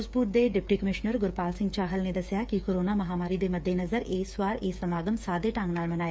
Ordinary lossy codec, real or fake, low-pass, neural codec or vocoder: none; fake; none; codec, 16 kHz, 8 kbps, FreqCodec, smaller model